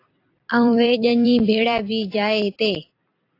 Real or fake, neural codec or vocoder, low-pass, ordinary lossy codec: fake; vocoder, 44.1 kHz, 128 mel bands every 256 samples, BigVGAN v2; 5.4 kHz; AAC, 32 kbps